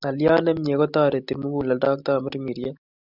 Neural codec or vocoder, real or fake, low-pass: none; real; 5.4 kHz